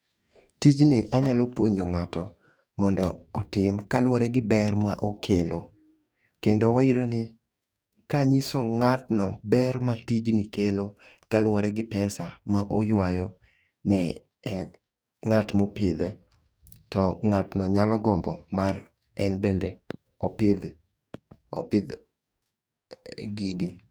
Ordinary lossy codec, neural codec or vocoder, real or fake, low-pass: none; codec, 44.1 kHz, 2.6 kbps, DAC; fake; none